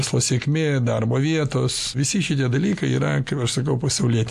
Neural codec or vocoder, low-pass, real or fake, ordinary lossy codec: none; 14.4 kHz; real; MP3, 96 kbps